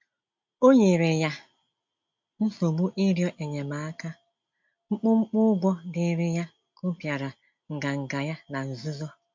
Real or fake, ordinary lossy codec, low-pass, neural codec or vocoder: real; MP3, 48 kbps; 7.2 kHz; none